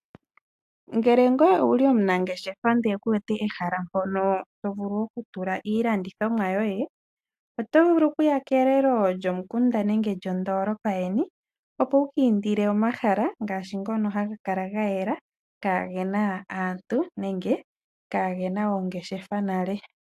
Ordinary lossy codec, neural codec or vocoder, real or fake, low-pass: AAC, 96 kbps; none; real; 14.4 kHz